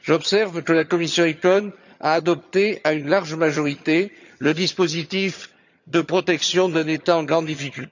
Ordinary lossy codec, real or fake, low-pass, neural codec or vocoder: none; fake; 7.2 kHz; vocoder, 22.05 kHz, 80 mel bands, HiFi-GAN